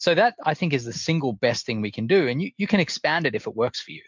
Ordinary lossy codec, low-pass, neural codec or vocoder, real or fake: MP3, 64 kbps; 7.2 kHz; none; real